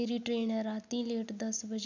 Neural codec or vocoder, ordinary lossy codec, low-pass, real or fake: none; none; 7.2 kHz; real